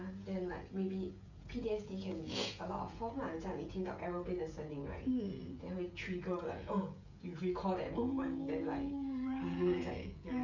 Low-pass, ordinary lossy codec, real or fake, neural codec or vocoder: 7.2 kHz; none; fake; codec, 16 kHz, 16 kbps, FreqCodec, smaller model